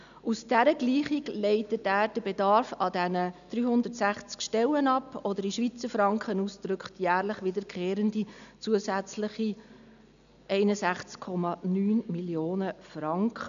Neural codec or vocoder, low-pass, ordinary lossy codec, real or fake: none; 7.2 kHz; none; real